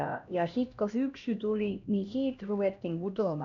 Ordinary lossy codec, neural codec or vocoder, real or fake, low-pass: none; codec, 16 kHz, 1 kbps, X-Codec, HuBERT features, trained on LibriSpeech; fake; 7.2 kHz